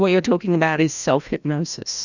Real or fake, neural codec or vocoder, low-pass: fake; codec, 16 kHz, 1 kbps, FreqCodec, larger model; 7.2 kHz